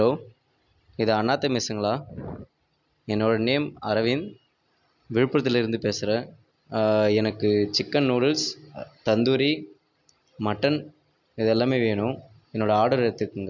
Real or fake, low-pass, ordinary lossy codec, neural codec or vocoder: real; 7.2 kHz; none; none